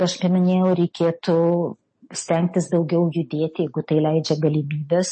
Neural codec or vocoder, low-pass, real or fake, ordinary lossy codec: none; 9.9 kHz; real; MP3, 32 kbps